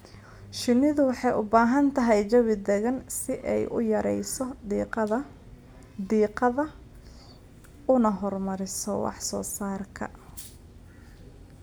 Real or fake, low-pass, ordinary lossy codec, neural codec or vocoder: real; none; none; none